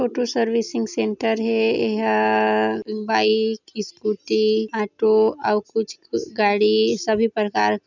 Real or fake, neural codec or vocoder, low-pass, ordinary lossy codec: real; none; 7.2 kHz; none